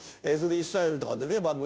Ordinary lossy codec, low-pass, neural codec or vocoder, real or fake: none; none; codec, 16 kHz, 0.5 kbps, FunCodec, trained on Chinese and English, 25 frames a second; fake